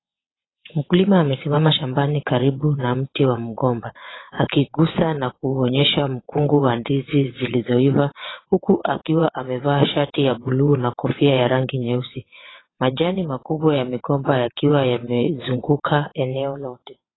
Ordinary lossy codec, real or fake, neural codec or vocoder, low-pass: AAC, 16 kbps; fake; vocoder, 22.05 kHz, 80 mel bands, WaveNeXt; 7.2 kHz